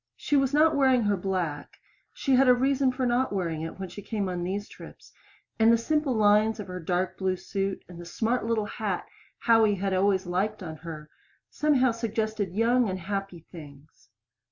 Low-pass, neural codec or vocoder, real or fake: 7.2 kHz; none; real